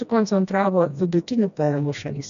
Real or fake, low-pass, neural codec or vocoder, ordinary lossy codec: fake; 7.2 kHz; codec, 16 kHz, 1 kbps, FreqCodec, smaller model; MP3, 96 kbps